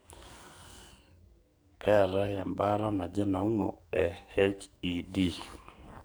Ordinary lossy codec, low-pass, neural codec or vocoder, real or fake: none; none; codec, 44.1 kHz, 2.6 kbps, SNAC; fake